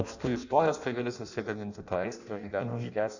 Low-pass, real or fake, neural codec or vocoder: 7.2 kHz; fake; codec, 16 kHz in and 24 kHz out, 0.6 kbps, FireRedTTS-2 codec